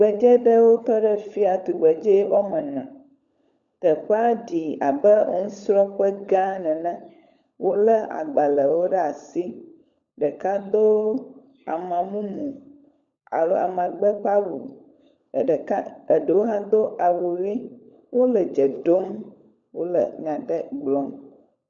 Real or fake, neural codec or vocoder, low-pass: fake; codec, 16 kHz, 16 kbps, FunCodec, trained on LibriTTS, 50 frames a second; 7.2 kHz